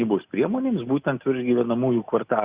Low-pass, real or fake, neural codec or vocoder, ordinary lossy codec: 3.6 kHz; real; none; Opus, 64 kbps